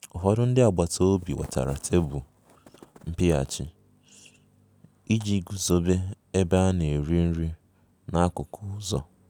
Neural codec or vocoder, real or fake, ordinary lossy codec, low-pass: none; real; none; none